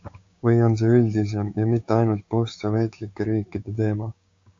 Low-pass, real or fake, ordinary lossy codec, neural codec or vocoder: 7.2 kHz; fake; AAC, 48 kbps; codec, 16 kHz, 6 kbps, DAC